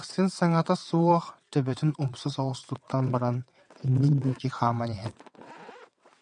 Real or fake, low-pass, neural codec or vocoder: fake; 9.9 kHz; vocoder, 22.05 kHz, 80 mel bands, WaveNeXt